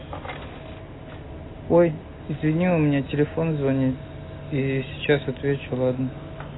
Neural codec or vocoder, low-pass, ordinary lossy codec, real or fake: none; 7.2 kHz; AAC, 16 kbps; real